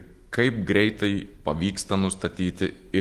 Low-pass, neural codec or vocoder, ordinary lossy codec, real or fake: 14.4 kHz; autoencoder, 48 kHz, 128 numbers a frame, DAC-VAE, trained on Japanese speech; Opus, 24 kbps; fake